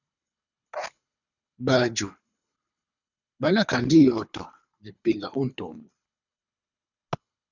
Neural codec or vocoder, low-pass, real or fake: codec, 24 kHz, 3 kbps, HILCodec; 7.2 kHz; fake